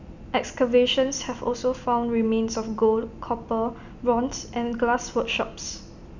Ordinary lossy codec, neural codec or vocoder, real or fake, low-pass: none; none; real; 7.2 kHz